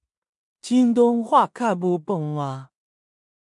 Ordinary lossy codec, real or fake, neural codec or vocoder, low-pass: MP3, 64 kbps; fake; codec, 16 kHz in and 24 kHz out, 0.4 kbps, LongCat-Audio-Codec, two codebook decoder; 10.8 kHz